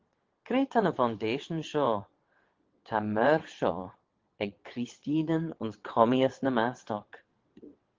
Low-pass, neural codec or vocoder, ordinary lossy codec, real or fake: 7.2 kHz; vocoder, 22.05 kHz, 80 mel bands, WaveNeXt; Opus, 16 kbps; fake